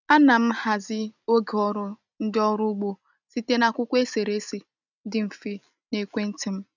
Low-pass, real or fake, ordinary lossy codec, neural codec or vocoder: 7.2 kHz; real; none; none